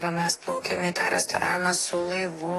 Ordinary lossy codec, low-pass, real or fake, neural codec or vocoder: AAC, 48 kbps; 14.4 kHz; fake; codec, 44.1 kHz, 2.6 kbps, DAC